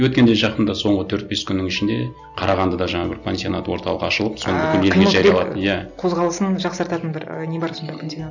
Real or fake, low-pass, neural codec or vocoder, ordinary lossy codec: real; 7.2 kHz; none; none